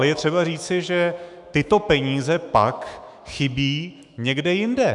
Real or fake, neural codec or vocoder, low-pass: fake; autoencoder, 48 kHz, 128 numbers a frame, DAC-VAE, trained on Japanese speech; 10.8 kHz